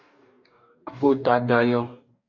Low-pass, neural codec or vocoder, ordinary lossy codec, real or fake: 7.2 kHz; codec, 44.1 kHz, 2.6 kbps, DAC; MP3, 48 kbps; fake